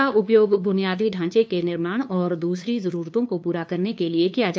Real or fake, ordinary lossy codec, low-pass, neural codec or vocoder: fake; none; none; codec, 16 kHz, 2 kbps, FunCodec, trained on LibriTTS, 25 frames a second